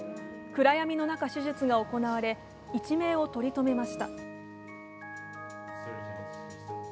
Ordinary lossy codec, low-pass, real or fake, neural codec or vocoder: none; none; real; none